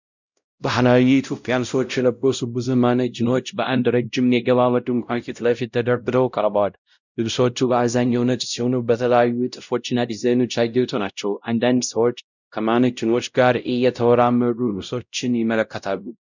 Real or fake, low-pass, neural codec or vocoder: fake; 7.2 kHz; codec, 16 kHz, 0.5 kbps, X-Codec, WavLM features, trained on Multilingual LibriSpeech